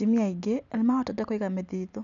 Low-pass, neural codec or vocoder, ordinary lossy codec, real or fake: 7.2 kHz; none; none; real